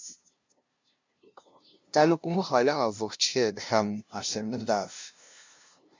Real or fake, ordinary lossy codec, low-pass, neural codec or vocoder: fake; MP3, 64 kbps; 7.2 kHz; codec, 16 kHz, 0.5 kbps, FunCodec, trained on LibriTTS, 25 frames a second